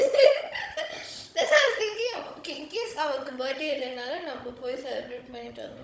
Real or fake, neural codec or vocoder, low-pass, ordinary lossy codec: fake; codec, 16 kHz, 16 kbps, FunCodec, trained on Chinese and English, 50 frames a second; none; none